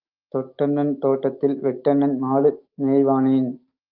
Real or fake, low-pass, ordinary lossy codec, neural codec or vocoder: fake; 5.4 kHz; Opus, 32 kbps; autoencoder, 48 kHz, 128 numbers a frame, DAC-VAE, trained on Japanese speech